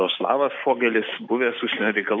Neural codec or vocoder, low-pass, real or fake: none; 7.2 kHz; real